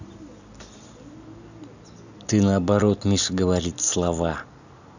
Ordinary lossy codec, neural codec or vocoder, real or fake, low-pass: none; none; real; 7.2 kHz